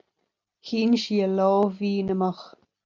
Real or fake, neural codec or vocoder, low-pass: real; none; 7.2 kHz